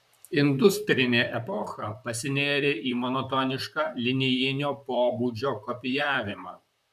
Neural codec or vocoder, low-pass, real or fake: vocoder, 44.1 kHz, 128 mel bands, Pupu-Vocoder; 14.4 kHz; fake